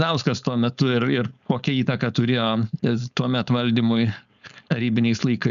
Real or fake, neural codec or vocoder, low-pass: fake; codec, 16 kHz, 4.8 kbps, FACodec; 7.2 kHz